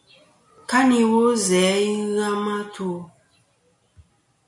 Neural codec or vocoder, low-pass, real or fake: none; 10.8 kHz; real